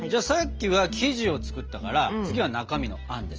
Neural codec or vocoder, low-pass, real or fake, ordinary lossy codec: none; 7.2 kHz; real; Opus, 24 kbps